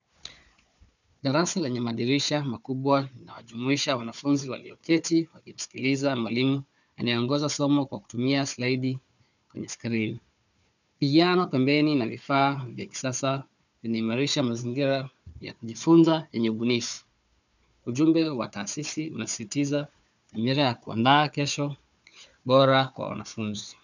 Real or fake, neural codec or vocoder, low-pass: fake; codec, 16 kHz, 4 kbps, FunCodec, trained on Chinese and English, 50 frames a second; 7.2 kHz